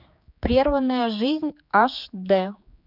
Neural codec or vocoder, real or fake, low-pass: codec, 16 kHz, 4 kbps, X-Codec, HuBERT features, trained on general audio; fake; 5.4 kHz